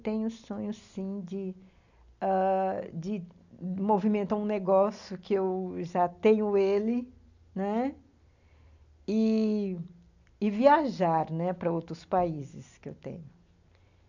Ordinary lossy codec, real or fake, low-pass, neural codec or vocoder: none; real; 7.2 kHz; none